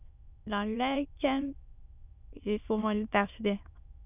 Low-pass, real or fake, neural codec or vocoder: 3.6 kHz; fake; autoencoder, 22.05 kHz, a latent of 192 numbers a frame, VITS, trained on many speakers